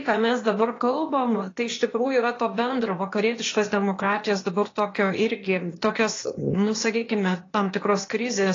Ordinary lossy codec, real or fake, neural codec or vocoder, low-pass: AAC, 32 kbps; fake; codec, 16 kHz, 0.8 kbps, ZipCodec; 7.2 kHz